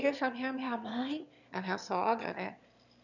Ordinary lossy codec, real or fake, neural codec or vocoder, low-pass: none; fake; autoencoder, 22.05 kHz, a latent of 192 numbers a frame, VITS, trained on one speaker; 7.2 kHz